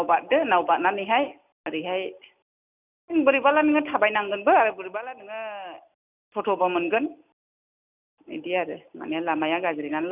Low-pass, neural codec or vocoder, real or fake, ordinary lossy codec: 3.6 kHz; none; real; none